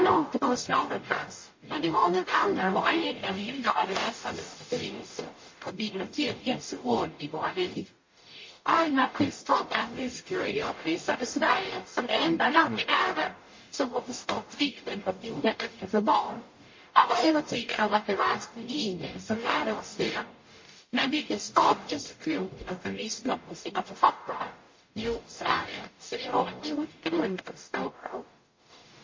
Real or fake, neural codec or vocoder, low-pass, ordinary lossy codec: fake; codec, 44.1 kHz, 0.9 kbps, DAC; 7.2 kHz; MP3, 32 kbps